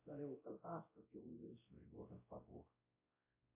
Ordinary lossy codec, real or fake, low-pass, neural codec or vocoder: MP3, 24 kbps; fake; 3.6 kHz; codec, 16 kHz, 0.5 kbps, X-Codec, WavLM features, trained on Multilingual LibriSpeech